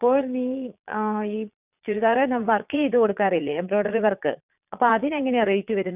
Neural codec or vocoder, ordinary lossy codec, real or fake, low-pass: vocoder, 22.05 kHz, 80 mel bands, Vocos; none; fake; 3.6 kHz